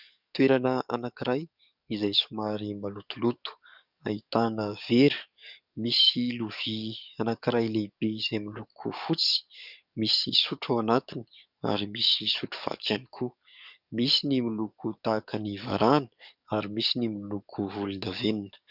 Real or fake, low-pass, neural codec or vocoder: fake; 5.4 kHz; codec, 44.1 kHz, 7.8 kbps, DAC